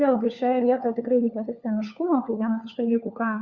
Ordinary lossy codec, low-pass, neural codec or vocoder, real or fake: Opus, 64 kbps; 7.2 kHz; codec, 16 kHz, 4 kbps, FunCodec, trained on LibriTTS, 50 frames a second; fake